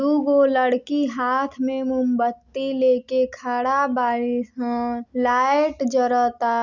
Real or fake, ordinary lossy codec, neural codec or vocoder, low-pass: real; none; none; 7.2 kHz